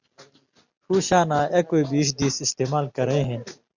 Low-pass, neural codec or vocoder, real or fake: 7.2 kHz; none; real